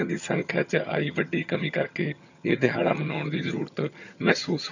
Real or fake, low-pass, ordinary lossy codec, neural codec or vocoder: fake; 7.2 kHz; none; vocoder, 22.05 kHz, 80 mel bands, HiFi-GAN